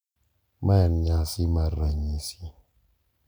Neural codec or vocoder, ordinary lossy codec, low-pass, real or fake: none; none; none; real